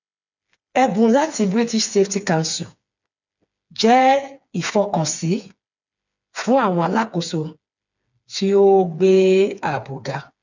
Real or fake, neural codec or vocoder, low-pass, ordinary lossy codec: fake; codec, 16 kHz, 4 kbps, FreqCodec, smaller model; 7.2 kHz; none